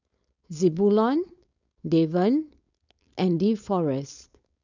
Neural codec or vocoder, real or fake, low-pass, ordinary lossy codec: codec, 16 kHz, 4.8 kbps, FACodec; fake; 7.2 kHz; none